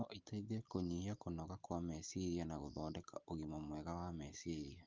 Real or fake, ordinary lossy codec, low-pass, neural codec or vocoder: real; Opus, 32 kbps; 7.2 kHz; none